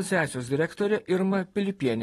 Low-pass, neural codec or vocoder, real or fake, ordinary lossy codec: 19.8 kHz; vocoder, 44.1 kHz, 128 mel bands every 512 samples, BigVGAN v2; fake; AAC, 32 kbps